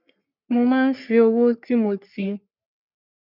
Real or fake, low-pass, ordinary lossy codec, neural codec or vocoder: fake; 5.4 kHz; none; codec, 44.1 kHz, 3.4 kbps, Pupu-Codec